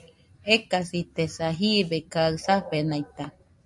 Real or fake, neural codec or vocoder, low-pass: real; none; 10.8 kHz